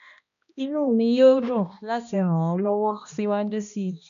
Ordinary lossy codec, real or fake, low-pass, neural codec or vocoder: none; fake; 7.2 kHz; codec, 16 kHz, 1 kbps, X-Codec, HuBERT features, trained on balanced general audio